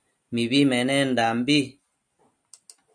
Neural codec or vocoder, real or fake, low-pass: none; real; 9.9 kHz